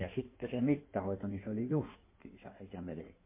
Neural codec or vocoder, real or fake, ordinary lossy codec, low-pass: codec, 16 kHz in and 24 kHz out, 1.1 kbps, FireRedTTS-2 codec; fake; MP3, 24 kbps; 3.6 kHz